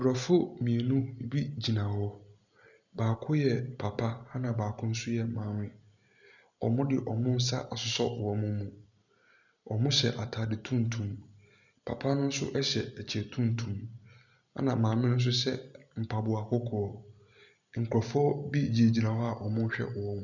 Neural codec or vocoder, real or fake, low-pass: none; real; 7.2 kHz